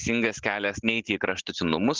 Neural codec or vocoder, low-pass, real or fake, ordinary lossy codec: none; 7.2 kHz; real; Opus, 16 kbps